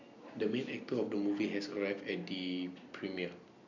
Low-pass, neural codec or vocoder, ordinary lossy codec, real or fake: 7.2 kHz; none; none; real